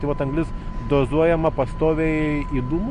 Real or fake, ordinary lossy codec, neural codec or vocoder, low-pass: real; MP3, 48 kbps; none; 14.4 kHz